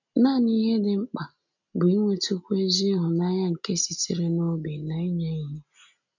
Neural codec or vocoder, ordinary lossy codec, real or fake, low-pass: none; none; real; 7.2 kHz